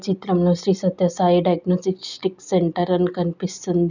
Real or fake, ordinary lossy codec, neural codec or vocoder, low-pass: real; none; none; 7.2 kHz